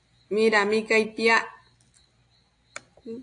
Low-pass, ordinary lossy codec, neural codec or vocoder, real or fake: 9.9 kHz; AAC, 48 kbps; none; real